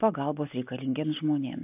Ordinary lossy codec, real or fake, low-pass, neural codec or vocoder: AAC, 24 kbps; real; 3.6 kHz; none